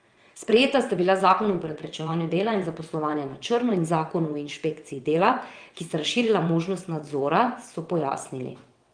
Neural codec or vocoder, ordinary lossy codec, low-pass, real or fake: vocoder, 22.05 kHz, 80 mel bands, WaveNeXt; Opus, 32 kbps; 9.9 kHz; fake